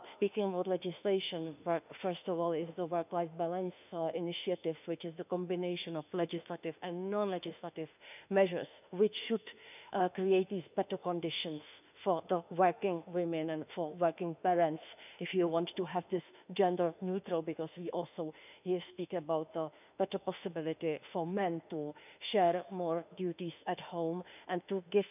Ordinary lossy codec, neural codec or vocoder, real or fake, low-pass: none; autoencoder, 48 kHz, 32 numbers a frame, DAC-VAE, trained on Japanese speech; fake; 3.6 kHz